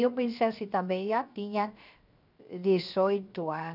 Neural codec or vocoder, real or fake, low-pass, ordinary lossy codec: codec, 16 kHz, 0.7 kbps, FocalCodec; fake; 5.4 kHz; none